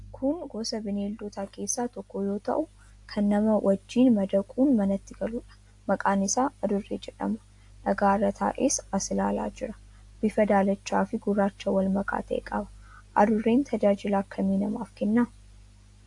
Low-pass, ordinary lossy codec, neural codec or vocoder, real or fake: 10.8 kHz; AAC, 64 kbps; none; real